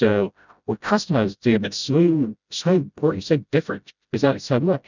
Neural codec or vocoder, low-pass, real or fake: codec, 16 kHz, 0.5 kbps, FreqCodec, smaller model; 7.2 kHz; fake